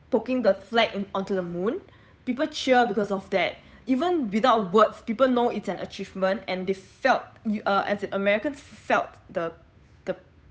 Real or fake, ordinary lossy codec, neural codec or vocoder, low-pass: fake; none; codec, 16 kHz, 8 kbps, FunCodec, trained on Chinese and English, 25 frames a second; none